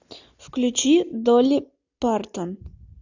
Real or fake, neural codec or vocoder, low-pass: real; none; 7.2 kHz